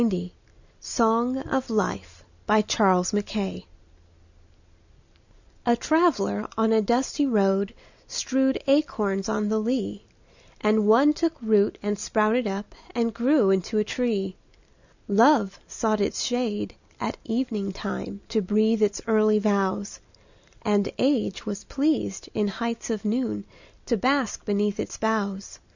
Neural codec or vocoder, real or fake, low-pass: none; real; 7.2 kHz